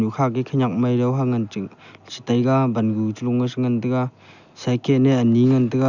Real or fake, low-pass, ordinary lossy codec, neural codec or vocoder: real; 7.2 kHz; none; none